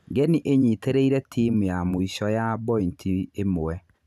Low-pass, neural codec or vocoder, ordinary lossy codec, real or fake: 14.4 kHz; vocoder, 44.1 kHz, 128 mel bands every 512 samples, BigVGAN v2; none; fake